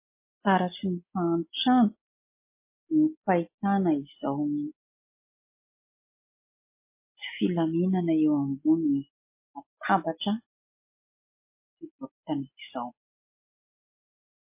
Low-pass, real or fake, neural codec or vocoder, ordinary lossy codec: 3.6 kHz; real; none; MP3, 24 kbps